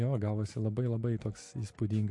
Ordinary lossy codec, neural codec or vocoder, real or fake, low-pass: MP3, 48 kbps; none; real; 10.8 kHz